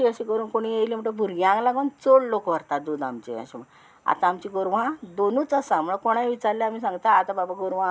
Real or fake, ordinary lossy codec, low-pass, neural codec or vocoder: real; none; none; none